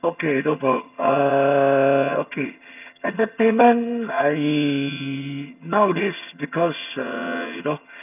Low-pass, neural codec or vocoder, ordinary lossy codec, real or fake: 3.6 kHz; vocoder, 22.05 kHz, 80 mel bands, HiFi-GAN; none; fake